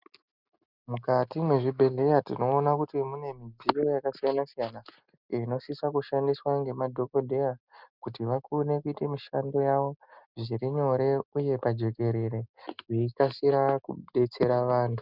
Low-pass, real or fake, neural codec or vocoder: 5.4 kHz; real; none